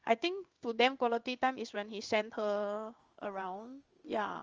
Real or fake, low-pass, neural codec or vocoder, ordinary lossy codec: fake; 7.2 kHz; codec, 16 kHz in and 24 kHz out, 1 kbps, XY-Tokenizer; Opus, 24 kbps